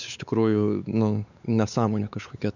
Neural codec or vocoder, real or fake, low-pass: codec, 16 kHz, 4 kbps, X-Codec, WavLM features, trained on Multilingual LibriSpeech; fake; 7.2 kHz